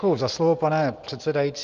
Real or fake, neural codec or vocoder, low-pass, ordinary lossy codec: real; none; 7.2 kHz; Opus, 32 kbps